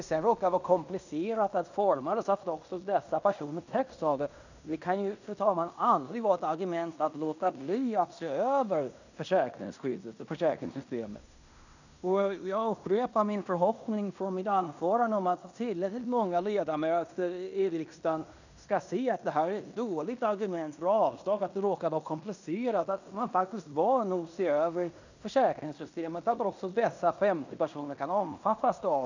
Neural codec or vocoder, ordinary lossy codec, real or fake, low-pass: codec, 16 kHz in and 24 kHz out, 0.9 kbps, LongCat-Audio-Codec, fine tuned four codebook decoder; none; fake; 7.2 kHz